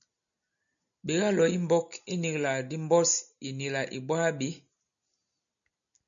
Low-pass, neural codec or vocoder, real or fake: 7.2 kHz; none; real